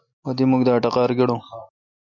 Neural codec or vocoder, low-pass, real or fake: none; 7.2 kHz; real